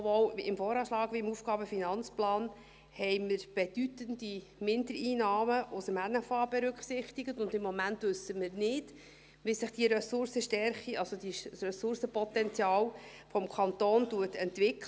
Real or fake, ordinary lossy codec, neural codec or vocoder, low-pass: real; none; none; none